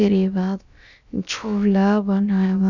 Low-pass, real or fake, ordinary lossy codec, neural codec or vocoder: 7.2 kHz; fake; none; codec, 16 kHz, about 1 kbps, DyCAST, with the encoder's durations